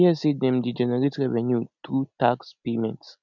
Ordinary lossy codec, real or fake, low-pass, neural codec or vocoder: none; real; 7.2 kHz; none